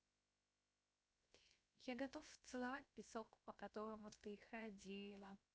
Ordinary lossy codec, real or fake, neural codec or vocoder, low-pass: none; fake; codec, 16 kHz, 0.7 kbps, FocalCodec; none